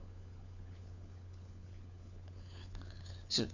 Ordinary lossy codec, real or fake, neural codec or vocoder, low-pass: none; fake; codec, 16 kHz, 4 kbps, FunCodec, trained on LibriTTS, 50 frames a second; 7.2 kHz